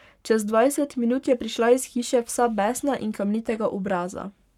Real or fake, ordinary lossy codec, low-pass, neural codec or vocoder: fake; none; 19.8 kHz; codec, 44.1 kHz, 7.8 kbps, Pupu-Codec